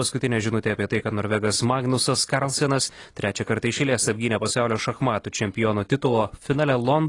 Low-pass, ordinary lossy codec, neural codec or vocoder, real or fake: 10.8 kHz; AAC, 32 kbps; none; real